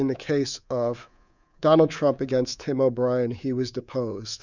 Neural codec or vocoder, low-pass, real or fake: codec, 24 kHz, 3.1 kbps, DualCodec; 7.2 kHz; fake